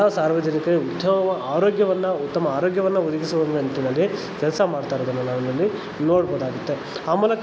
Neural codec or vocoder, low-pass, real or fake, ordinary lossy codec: none; none; real; none